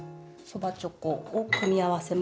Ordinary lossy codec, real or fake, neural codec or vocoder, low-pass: none; real; none; none